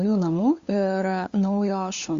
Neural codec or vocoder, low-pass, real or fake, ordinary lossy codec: codec, 16 kHz, 2 kbps, FunCodec, trained on LibriTTS, 25 frames a second; 7.2 kHz; fake; Opus, 64 kbps